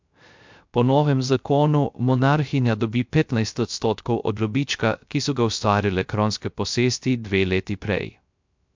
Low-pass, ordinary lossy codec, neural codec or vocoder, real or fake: 7.2 kHz; MP3, 64 kbps; codec, 16 kHz, 0.3 kbps, FocalCodec; fake